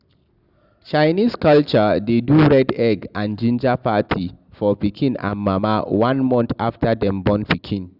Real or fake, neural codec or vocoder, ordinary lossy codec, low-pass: real; none; Opus, 64 kbps; 5.4 kHz